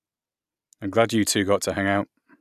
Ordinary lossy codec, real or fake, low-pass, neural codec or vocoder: none; real; 14.4 kHz; none